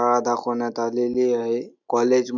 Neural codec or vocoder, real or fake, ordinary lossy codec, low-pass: none; real; none; 7.2 kHz